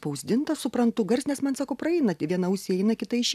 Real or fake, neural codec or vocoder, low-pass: real; none; 14.4 kHz